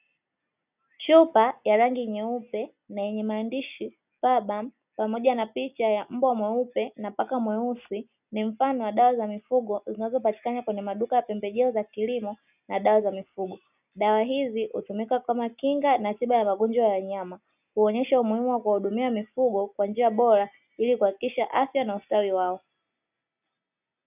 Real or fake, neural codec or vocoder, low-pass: real; none; 3.6 kHz